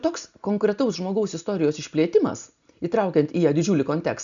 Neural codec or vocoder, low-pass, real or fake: none; 7.2 kHz; real